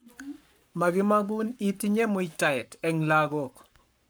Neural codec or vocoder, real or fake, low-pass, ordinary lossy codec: codec, 44.1 kHz, 7.8 kbps, Pupu-Codec; fake; none; none